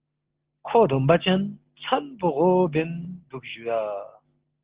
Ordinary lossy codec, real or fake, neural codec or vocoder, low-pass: Opus, 16 kbps; real; none; 3.6 kHz